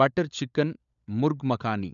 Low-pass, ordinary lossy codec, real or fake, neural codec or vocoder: 7.2 kHz; none; fake; codec, 16 kHz, 16 kbps, FunCodec, trained on LibriTTS, 50 frames a second